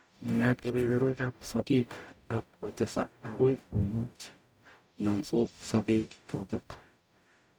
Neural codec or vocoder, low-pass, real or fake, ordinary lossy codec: codec, 44.1 kHz, 0.9 kbps, DAC; none; fake; none